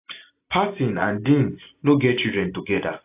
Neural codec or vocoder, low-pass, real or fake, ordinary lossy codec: none; 3.6 kHz; real; AAC, 24 kbps